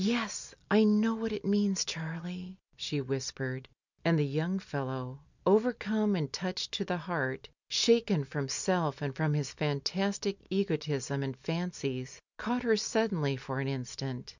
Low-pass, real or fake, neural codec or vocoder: 7.2 kHz; real; none